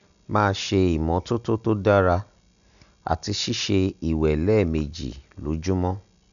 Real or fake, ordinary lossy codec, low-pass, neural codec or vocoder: real; none; 7.2 kHz; none